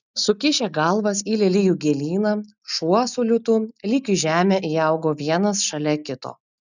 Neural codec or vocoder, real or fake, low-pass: none; real; 7.2 kHz